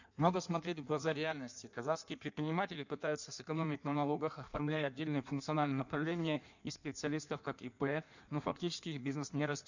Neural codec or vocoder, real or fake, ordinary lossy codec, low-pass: codec, 16 kHz in and 24 kHz out, 1.1 kbps, FireRedTTS-2 codec; fake; none; 7.2 kHz